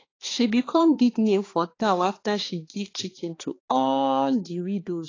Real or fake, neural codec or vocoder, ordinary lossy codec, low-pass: fake; codec, 16 kHz, 2 kbps, X-Codec, HuBERT features, trained on balanced general audio; AAC, 32 kbps; 7.2 kHz